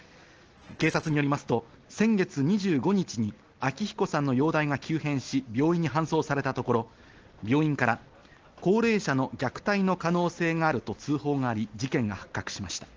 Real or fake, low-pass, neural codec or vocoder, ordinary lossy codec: real; 7.2 kHz; none; Opus, 16 kbps